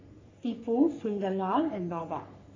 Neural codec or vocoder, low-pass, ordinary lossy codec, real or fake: codec, 44.1 kHz, 3.4 kbps, Pupu-Codec; 7.2 kHz; AAC, 32 kbps; fake